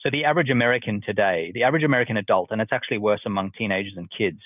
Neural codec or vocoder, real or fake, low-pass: none; real; 3.6 kHz